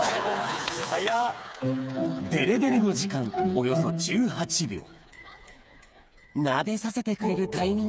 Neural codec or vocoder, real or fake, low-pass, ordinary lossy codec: codec, 16 kHz, 4 kbps, FreqCodec, smaller model; fake; none; none